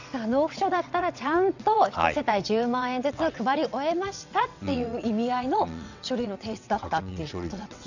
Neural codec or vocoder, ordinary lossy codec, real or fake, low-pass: vocoder, 22.05 kHz, 80 mel bands, WaveNeXt; none; fake; 7.2 kHz